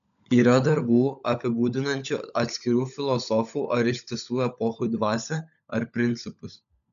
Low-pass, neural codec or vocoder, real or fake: 7.2 kHz; codec, 16 kHz, 16 kbps, FunCodec, trained on LibriTTS, 50 frames a second; fake